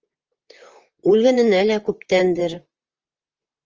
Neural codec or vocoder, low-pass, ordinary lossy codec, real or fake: vocoder, 44.1 kHz, 128 mel bands, Pupu-Vocoder; 7.2 kHz; Opus, 24 kbps; fake